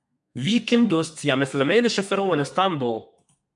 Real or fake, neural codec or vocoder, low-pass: fake; codec, 32 kHz, 1.9 kbps, SNAC; 10.8 kHz